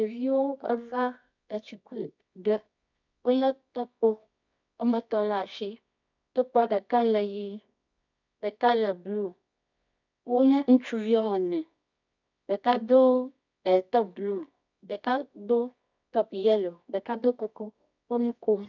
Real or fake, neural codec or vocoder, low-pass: fake; codec, 24 kHz, 0.9 kbps, WavTokenizer, medium music audio release; 7.2 kHz